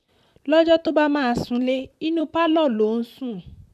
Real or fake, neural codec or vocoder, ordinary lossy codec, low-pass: fake; vocoder, 44.1 kHz, 128 mel bands, Pupu-Vocoder; none; 14.4 kHz